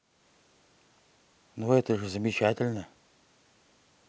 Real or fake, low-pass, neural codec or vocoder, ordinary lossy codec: real; none; none; none